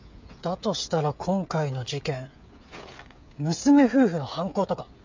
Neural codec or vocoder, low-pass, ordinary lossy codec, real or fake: codec, 16 kHz, 8 kbps, FreqCodec, smaller model; 7.2 kHz; MP3, 64 kbps; fake